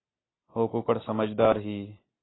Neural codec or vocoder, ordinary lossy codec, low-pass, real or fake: none; AAC, 16 kbps; 7.2 kHz; real